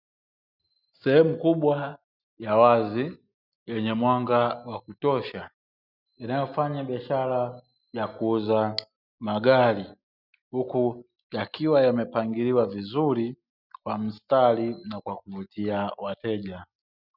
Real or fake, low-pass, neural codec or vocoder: real; 5.4 kHz; none